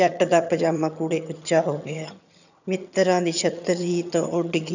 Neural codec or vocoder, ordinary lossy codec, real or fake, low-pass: vocoder, 22.05 kHz, 80 mel bands, HiFi-GAN; none; fake; 7.2 kHz